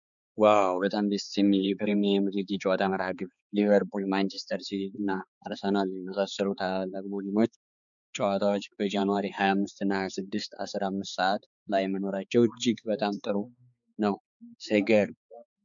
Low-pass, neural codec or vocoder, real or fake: 7.2 kHz; codec, 16 kHz, 4 kbps, X-Codec, HuBERT features, trained on balanced general audio; fake